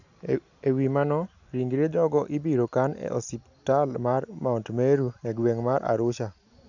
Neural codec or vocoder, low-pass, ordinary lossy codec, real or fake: none; 7.2 kHz; none; real